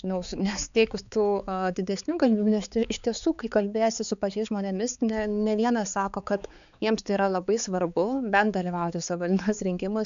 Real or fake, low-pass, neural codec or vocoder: fake; 7.2 kHz; codec, 16 kHz, 4 kbps, X-Codec, HuBERT features, trained on balanced general audio